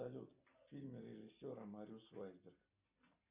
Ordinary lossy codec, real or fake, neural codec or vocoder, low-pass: Opus, 64 kbps; real; none; 3.6 kHz